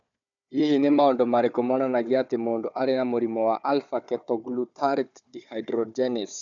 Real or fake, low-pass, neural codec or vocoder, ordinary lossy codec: fake; 7.2 kHz; codec, 16 kHz, 4 kbps, FunCodec, trained on Chinese and English, 50 frames a second; none